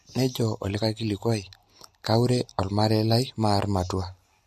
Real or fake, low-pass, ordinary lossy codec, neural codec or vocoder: real; 14.4 kHz; MP3, 64 kbps; none